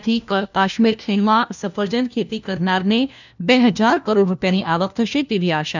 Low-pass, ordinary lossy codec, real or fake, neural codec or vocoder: 7.2 kHz; none; fake; codec, 16 kHz, 1 kbps, FunCodec, trained on LibriTTS, 50 frames a second